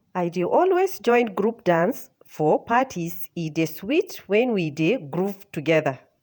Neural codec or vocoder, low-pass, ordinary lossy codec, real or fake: vocoder, 48 kHz, 128 mel bands, Vocos; none; none; fake